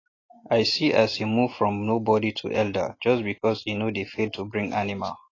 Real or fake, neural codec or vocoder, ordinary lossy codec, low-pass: real; none; AAC, 32 kbps; 7.2 kHz